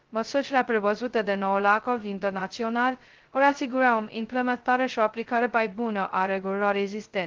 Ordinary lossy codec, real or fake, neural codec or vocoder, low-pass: Opus, 16 kbps; fake; codec, 16 kHz, 0.2 kbps, FocalCodec; 7.2 kHz